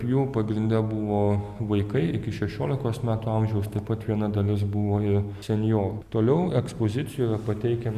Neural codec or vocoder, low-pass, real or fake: none; 14.4 kHz; real